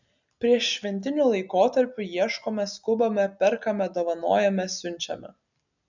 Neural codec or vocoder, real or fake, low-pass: none; real; 7.2 kHz